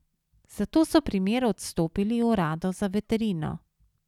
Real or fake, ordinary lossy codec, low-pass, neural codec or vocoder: real; none; 19.8 kHz; none